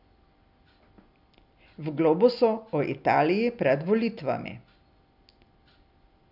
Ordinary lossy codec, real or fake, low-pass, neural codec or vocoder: none; real; 5.4 kHz; none